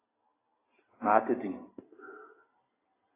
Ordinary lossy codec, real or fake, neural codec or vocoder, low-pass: AAC, 16 kbps; real; none; 3.6 kHz